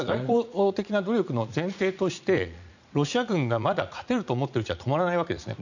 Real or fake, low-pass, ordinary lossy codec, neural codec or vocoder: fake; 7.2 kHz; none; vocoder, 44.1 kHz, 80 mel bands, Vocos